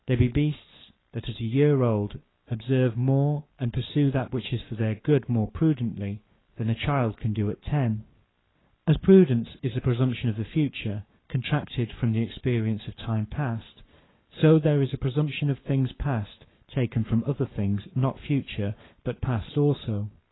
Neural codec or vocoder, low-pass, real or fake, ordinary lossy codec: codec, 44.1 kHz, 7.8 kbps, Pupu-Codec; 7.2 kHz; fake; AAC, 16 kbps